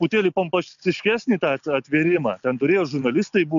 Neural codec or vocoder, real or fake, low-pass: none; real; 7.2 kHz